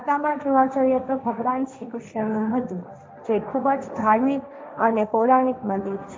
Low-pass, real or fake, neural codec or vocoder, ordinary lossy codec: none; fake; codec, 16 kHz, 1.1 kbps, Voila-Tokenizer; none